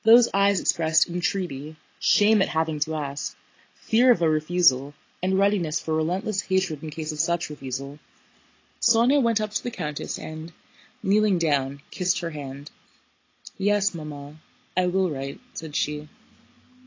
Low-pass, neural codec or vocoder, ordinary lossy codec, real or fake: 7.2 kHz; none; AAC, 32 kbps; real